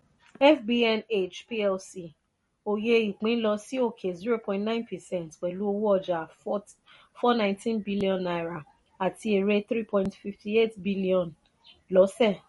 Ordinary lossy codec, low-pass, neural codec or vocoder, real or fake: MP3, 48 kbps; 19.8 kHz; none; real